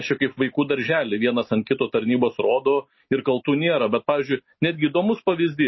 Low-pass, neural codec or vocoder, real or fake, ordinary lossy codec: 7.2 kHz; none; real; MP3, 24 kbps